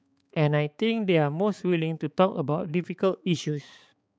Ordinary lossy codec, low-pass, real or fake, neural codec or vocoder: none; none; fake; codec, 16 kHz, 4 kbps, X-Codec, HuBERT features, trained on balanced general audio